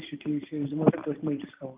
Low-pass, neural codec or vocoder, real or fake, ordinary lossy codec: 3.6 kHz; vocoder, 44.1 kHz, 128 mel bands every 512 samples, BigVGAN v2; fake; Opus, 32 kbps